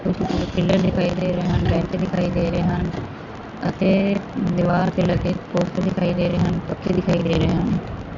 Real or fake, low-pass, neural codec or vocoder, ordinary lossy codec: real; 7.2 kHz; none; MP3, 64 kbps